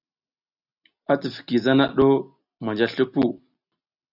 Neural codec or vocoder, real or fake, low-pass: none; real; 5.4 kHz